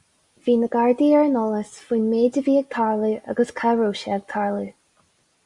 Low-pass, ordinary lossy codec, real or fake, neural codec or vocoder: 10.8 kHz; Opus, 64 kbps; real; none